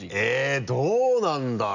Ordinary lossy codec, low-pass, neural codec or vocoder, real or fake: none; 7.2 kHz; none; real